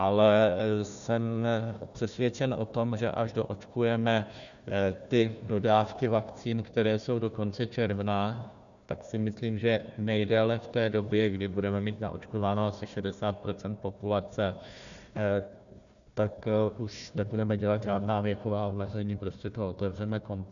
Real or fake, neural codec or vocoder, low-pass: fake; codec, 16 kHz, 1 kbps, FunCodec, trained on Chinese and English, 50 frames a second; 7.2 kHz